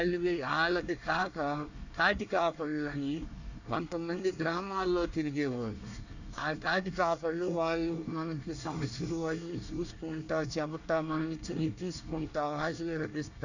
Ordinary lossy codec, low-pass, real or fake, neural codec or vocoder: none; 7.2 kHz; fake; codec, 24 kHz, 1 kbps, SNAC